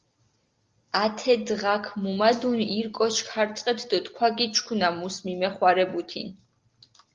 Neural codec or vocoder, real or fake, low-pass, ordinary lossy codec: none; real; 7.2 kHz; Opus, 32 kbps